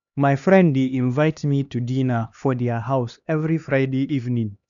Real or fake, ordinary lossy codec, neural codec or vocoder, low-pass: fake; none; codec, 16 kHz, 2 kbps, X-Codec, HuBERT features, trained on LibriSpeech; 7.2 kHz